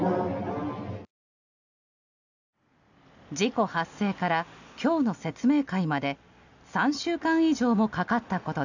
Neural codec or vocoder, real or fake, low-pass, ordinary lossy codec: none; real; 7.2 kHz; none